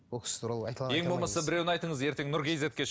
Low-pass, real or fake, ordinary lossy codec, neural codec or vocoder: none; real; none; none